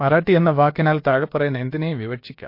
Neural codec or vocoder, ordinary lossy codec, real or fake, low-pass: codec, 16 kHz, about 1 kbps, DyCAST, with the encoder's durations; MP3, 32 kbps; fake; 5.4 kHz